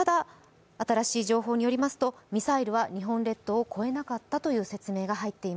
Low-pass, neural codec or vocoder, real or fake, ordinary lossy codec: none; none; real; none